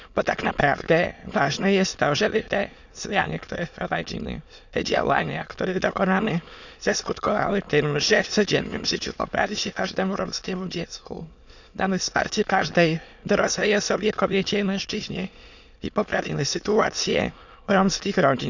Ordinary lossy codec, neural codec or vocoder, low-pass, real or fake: none; autoencoder, 22.05 kHz, a latent of 192 numbers a frame, VITS, trained on many speakers; 7.2 kHz; fake